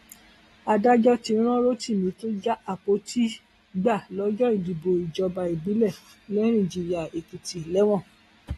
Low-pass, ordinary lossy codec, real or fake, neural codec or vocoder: 19.8 kHz; AAC, 32 kbps; real; none